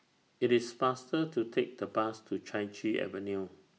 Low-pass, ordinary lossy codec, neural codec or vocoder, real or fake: none; none; none; real